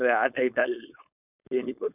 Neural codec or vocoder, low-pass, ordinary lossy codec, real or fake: codec, 16 kHz, 4.8 kbps, FACodec; 3.6 kHz; none; fake